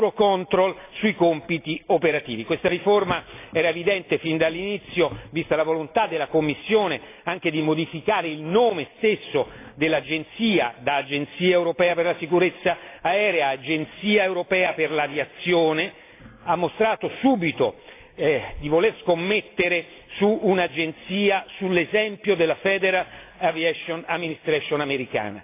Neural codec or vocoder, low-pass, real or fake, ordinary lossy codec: none; 3.6 kHz; real; AAC, 24 kbps